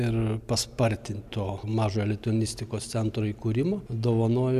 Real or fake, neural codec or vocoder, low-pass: real; none; 14.4 kHz